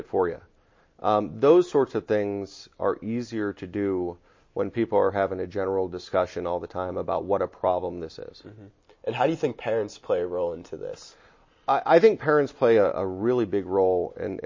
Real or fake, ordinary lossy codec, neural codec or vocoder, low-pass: real; MP3, 32 kbps; none; 7.2 kHz